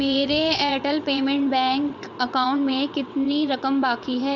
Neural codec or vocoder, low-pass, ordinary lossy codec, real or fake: vocoder, 22.05 kHz, 80 mel bands, WaveNeXt; 7.2 kHz; none; fake